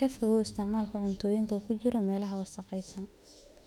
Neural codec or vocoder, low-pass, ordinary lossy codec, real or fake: autoencoder, 48 kHz, 32 numbers a frame, DAC-VAE, trained on Japanese speech; 19.8 kHz; none; fake